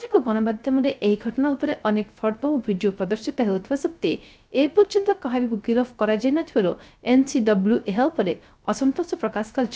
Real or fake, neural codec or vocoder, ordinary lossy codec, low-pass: fake; codec, 16 kHz, 0.3 kbps, FocalCodec; none; none